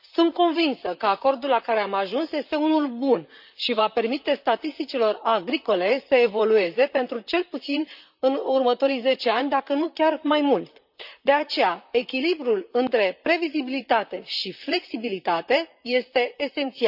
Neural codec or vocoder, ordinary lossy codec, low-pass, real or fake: vocoder, 44.1 kHz, 128 mel bands, Pupu-Vocoder; none; 5.4 kHz; fake